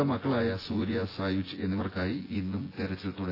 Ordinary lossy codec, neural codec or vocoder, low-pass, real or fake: AAC, 24 kbps; vocoder, 24 kHz, 100 mel bands, Vocos; 5.4 kHz; fake